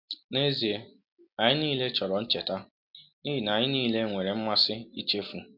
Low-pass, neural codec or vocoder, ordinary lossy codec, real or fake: 5.4 kHz; none; MP3, 48 kbps; real